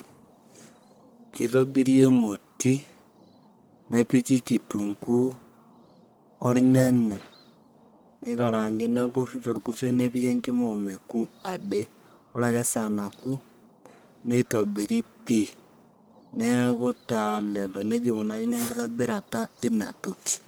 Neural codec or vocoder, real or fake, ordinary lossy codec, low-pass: codec, 44.1 kHz, 1.7 kbps, Pupu-Codec; fake; none; none